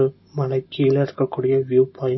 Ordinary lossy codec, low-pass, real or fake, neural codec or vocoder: MP3, 24 kbps; 7.2 kHz; real; none